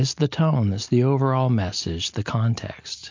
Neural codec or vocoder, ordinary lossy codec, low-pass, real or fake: none; MP3, 48 kbps; 7.2 kHz; real